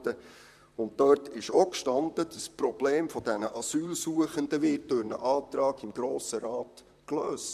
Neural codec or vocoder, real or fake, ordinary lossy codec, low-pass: vocoder, 44.1 kHz, 128 mel bands, Pupu-Vocoder; fake; MP3, 96 kbps; 14.4 kHz